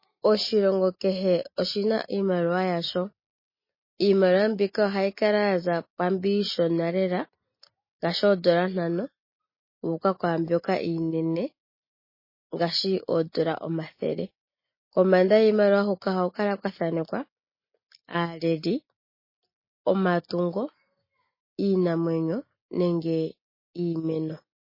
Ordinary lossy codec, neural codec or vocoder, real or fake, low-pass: MP3, 24 kbps; none; real; 5.4 kHz